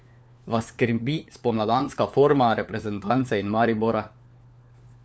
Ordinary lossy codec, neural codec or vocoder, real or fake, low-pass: none; codec, 16 kHz, 4 kbps, FunCodec, trained on LibriTTS, 50 frames a second; fake; none